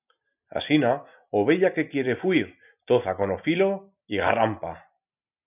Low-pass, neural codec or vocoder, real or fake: 3.6 kHz; none; real